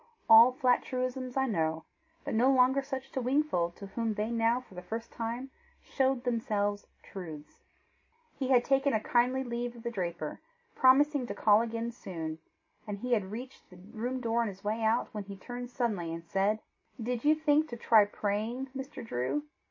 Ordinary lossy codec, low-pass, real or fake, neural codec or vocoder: MP3, 32 kbps; 7.2 kHz; real; none